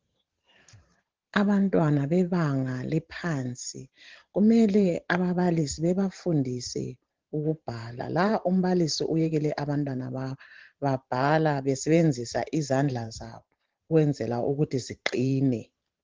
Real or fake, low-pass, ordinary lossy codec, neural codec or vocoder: fake; 7.2 kHz; Opus, 16 kbps; vocoder, 44.1 kHz, 128 mel bands every 512 samples, BigVGAN v2